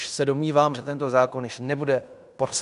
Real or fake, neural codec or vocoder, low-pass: fake; codec, 16 kHz in and 24 kHz out, 0.9 kbps, LongCat-Audio-Codec, fine tuned four codebook decoder; 10.8 kHz